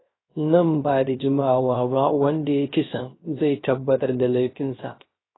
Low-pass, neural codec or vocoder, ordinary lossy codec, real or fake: 7.2 kHz; codec, 16 kHz, 0.3 kbps, FocalCodec; AAC, 16 kbps; fake